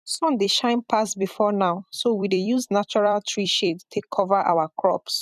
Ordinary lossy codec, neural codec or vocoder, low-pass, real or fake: none; vocoder, 44.1 kHz, 128 mel bands every 256 samples, BigVGAN v2; 14.4 kHz; fake